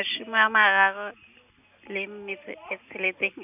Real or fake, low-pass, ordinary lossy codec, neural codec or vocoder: real; 3.6 kHz; none; none